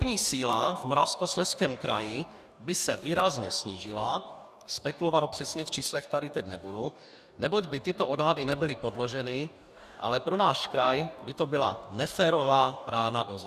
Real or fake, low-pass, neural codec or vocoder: fake; 14.4 kHz; codec, 44.1 kHz, 2.6 kbps, DAC